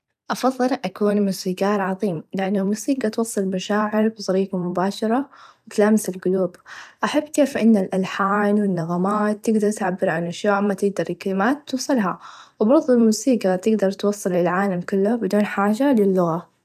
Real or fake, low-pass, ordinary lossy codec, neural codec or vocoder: fake; 14.4 kHz; none; vocoder, 44.1 kHz, 128 mel bands every 512 samples, BigVGAN v2